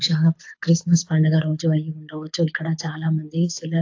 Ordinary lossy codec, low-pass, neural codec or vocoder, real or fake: AAC, 48 kbps; 7.2 kHz; codec, 24 kHz, 6 kbps, HILCodec; fake